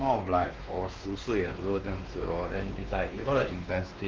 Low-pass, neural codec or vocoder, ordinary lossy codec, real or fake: 7.2 kHz; codec, 16 kHz, 2 kbps, X-Codec, WavLM features, trained on Multilingual LibriSpeech; Opus, 32 kbps; fake